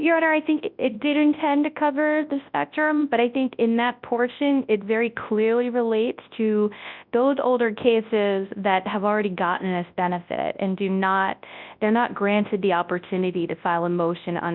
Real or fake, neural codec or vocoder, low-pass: fake; codec, 24 kHz, 0.9 kbps, WavTokenizer, large speech release; 5.4 kHz